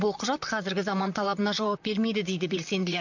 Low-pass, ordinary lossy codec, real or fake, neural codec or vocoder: 7.2 kHz; none; fake; vocoder, 44.1 kHz, 128 mel bands, Pupu-Vocoder